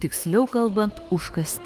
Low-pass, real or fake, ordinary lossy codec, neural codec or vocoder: 14.4 kHz; fake; Opus, 24 kbps; autoencoder, 48 kHz, 32 numbers a frame, DAC-VAE, trained on Japanese speech